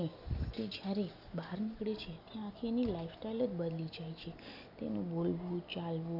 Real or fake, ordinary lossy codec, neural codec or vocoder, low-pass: real; none; none; 5.4 kHz